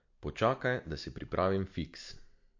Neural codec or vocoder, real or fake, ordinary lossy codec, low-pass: none; real; MP3, 48 kbps; 7.2 kHz